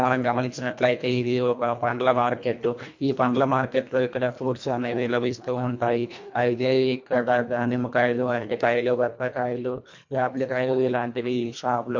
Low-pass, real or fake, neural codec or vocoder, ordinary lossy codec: 7.2 kHz; fake; codec, 24 kHz, 1.5 kbps, HILCodec; MP3, 48 kbps